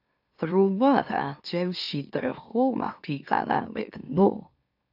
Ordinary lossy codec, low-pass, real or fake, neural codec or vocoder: AAC, 48 kbps; 5.4 kHz; fake; autoencoder, 44.1 kHz, a latent of 192 numbers a frame, MeloTTS